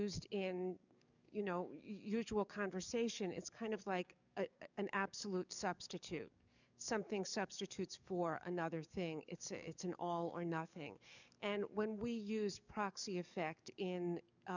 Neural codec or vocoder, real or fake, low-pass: codec, 44.1 kHz, 7.8 kbps, DAC; fake; 7.2 kHz